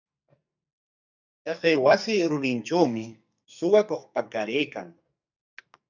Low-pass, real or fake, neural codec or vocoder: 7.2 kHz; fake; codec, 44.1 kHz, 2.6 kbps, SNAC